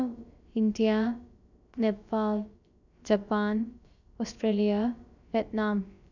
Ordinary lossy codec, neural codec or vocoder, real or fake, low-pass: none; codec, 16 kHz, about 1 kbps, DyCAST, with the encoder's durations; fake; 7.2 kHz